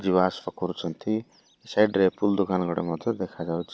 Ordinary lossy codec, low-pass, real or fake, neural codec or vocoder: none; none; real; none